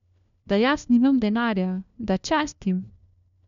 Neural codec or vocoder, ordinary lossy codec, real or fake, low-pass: codec, 16 kHz, 1 kbps, FunCodec, trained on LibriTTS, 50 frames a second; MP3, 64 kbps; fake; 7.2 kHz